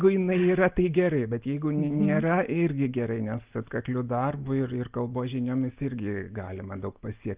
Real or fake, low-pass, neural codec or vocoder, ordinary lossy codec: real; 3.6 kHz; none; Opus, 24 kbps